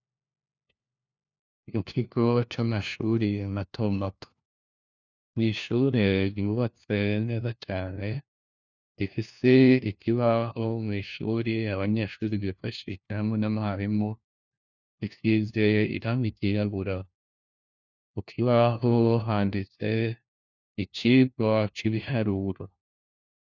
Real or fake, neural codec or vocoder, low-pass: fake; codec, 16 kHz, 1 kbps, FunCodec, trained on LibriTTS, 50 frames a second; 7.2 kHz